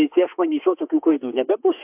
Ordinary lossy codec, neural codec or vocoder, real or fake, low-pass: AAC, 32 kbps; autoencoder, 48 kHz, 32 numbers a frame, DAC-VAE, trained on Japanese speech; fake; 3.6 kHz